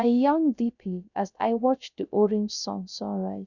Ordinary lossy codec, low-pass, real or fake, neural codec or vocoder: none; 7.2 kHz; fake; codec, 16 kHz, 0.3 kbps, FocalCodec